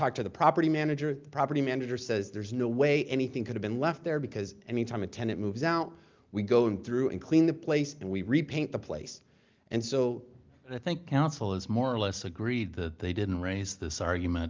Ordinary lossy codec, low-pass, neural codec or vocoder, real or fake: Opus, 32 kbps; 7.2 kHz; none; real